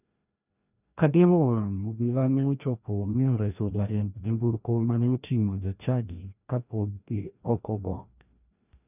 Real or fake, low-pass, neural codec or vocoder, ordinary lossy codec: fake; 3.6 kHz; codec, 16 kHz, 1 kbps, FreqCodec, larger model; none